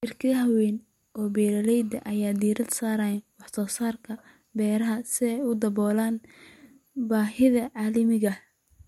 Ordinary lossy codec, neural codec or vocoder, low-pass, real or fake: MP3, 64 kbps; none; 19.8 kHz; real